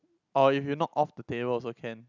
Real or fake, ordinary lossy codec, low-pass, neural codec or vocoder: real; none; 7.2 kHz; none